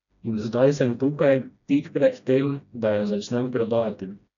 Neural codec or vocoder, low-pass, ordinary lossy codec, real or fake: codec, 16 kHz, 1 kbps, FreqCodec, smaller model; 7.2 kHz; none; fake